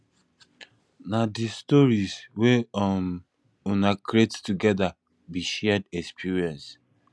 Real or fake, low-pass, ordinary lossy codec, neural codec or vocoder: real; none; none; none